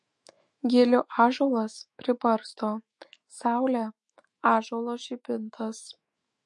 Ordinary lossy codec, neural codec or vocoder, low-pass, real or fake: MP3, 48 kbps; autoencoder, 48 kHz, 128 numbers a frame, DAC-VAE, trained on Japanese speech; 10.8 kHz; fake